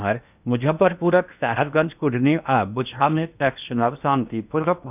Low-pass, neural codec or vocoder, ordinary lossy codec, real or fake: 3.6 kHz; codec, 16 kHz in and 24 kHz out, 0.8 kbps, FocalCodec, streaming, 65536 codes; none; fake